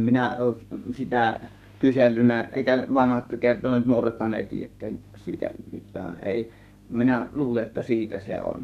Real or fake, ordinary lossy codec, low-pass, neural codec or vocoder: fake; none; 14.4 kHz; codec, 32 kHz, 1.9 kbps, SNAC